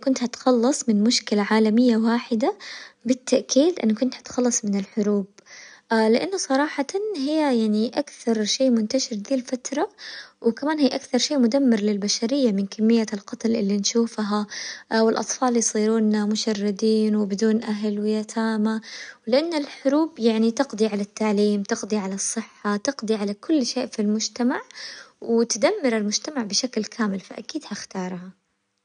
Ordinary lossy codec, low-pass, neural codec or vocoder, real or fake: none; 9.9 kHz; none; real